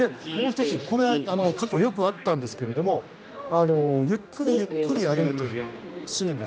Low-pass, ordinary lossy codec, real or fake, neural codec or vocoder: none; none; fake; codec, 16 kHz, 1 kbps, X-Codec, HuBERT features, trained on general audio